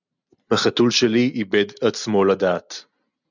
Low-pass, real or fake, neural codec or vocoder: 7.2 kHz; real; none